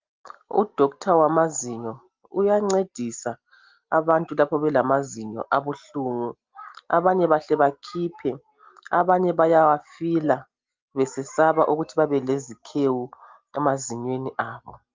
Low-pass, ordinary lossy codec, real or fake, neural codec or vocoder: 7.2 kHz; Opus, 32 kbps; real; none